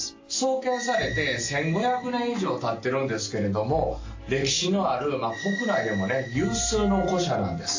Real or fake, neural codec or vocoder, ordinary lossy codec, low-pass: real; none; AAC, 48 kbps; 7.2 kHz